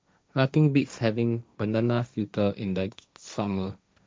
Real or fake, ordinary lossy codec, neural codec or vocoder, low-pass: fake; none; codec, 16 kHz, 1.1 kbps, Voila-Tokenizer; none